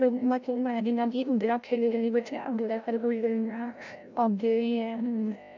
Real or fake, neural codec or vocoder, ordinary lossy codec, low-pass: fake; codec, 16 kHz, 0.5 kbps, FreqCodec, larger model; none; 7.2 kHz